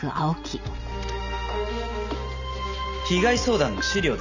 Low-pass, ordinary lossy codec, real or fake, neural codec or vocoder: 7.2 kHz; none; real; none